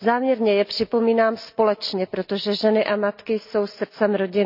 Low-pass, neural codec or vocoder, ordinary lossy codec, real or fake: 5.4 kHz; none; none; real